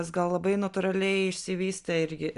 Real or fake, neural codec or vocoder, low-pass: real; none; 10.8 kHz